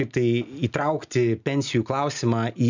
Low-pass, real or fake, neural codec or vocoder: 7.2 kHz; real; none